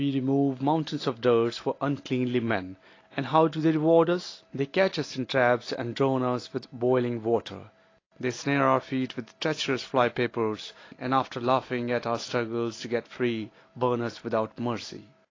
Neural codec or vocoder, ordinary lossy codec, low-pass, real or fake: none; AAC, 32 kbps; 7.2 kHz; real